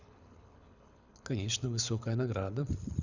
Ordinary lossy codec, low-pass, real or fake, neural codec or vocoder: none; 7.2 kHz; fake; codec, 24 kHz, 6 kbps, HILCodec